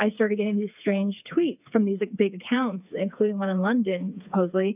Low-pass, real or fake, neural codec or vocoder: 3.6 kHz; fake; codec, 16 kHz, 4 kbps, FreqCodec, smaller model